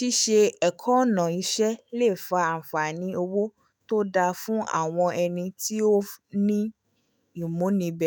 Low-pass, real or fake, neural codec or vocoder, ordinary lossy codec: none; fake; autoencoder, 48 kHz, 128 numbers a frame, DAC-VAE, trained on Japanese speech; none